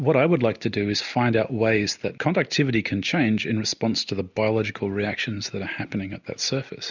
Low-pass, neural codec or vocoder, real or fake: 7.2 kHz; none; real